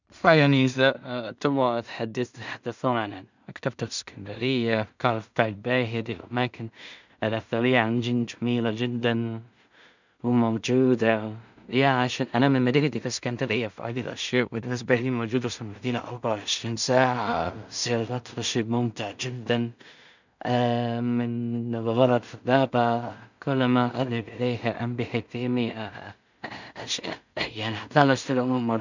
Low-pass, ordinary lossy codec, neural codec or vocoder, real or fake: 7.2 kHz; none; codec, 16 kHz in and 24 kHz out, 0.4 kbps, LongCat-Audio-Codec, two codebook decoder; fake